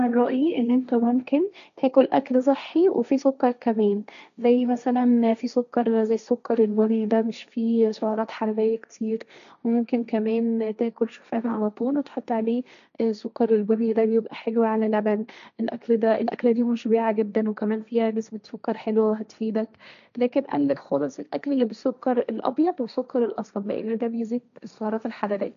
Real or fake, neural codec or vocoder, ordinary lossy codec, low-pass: fake; codec, 16 kHz, 1.1 kbps, Voila-Tokenizer; none; 7.2 kHz